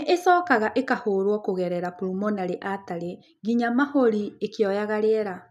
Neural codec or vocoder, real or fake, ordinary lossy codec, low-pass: none; real; none; 14.4 kHz